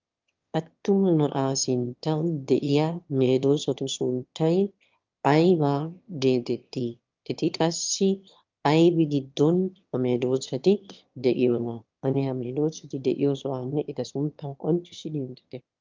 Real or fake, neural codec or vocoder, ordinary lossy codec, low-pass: fake; autoencoder, 22.05 kHz, a latent of 192 numbers a frame, VITS, trained on one speaker; Opus, 24 kbps; 7.2 kHz